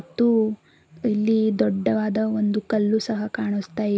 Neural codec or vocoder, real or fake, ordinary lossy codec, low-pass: none; real; none; none